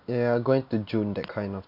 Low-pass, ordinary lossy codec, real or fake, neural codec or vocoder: 5.4 kHz; none; real; none